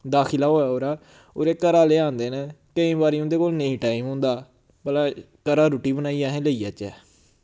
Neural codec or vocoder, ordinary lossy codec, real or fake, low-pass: none; none; real; none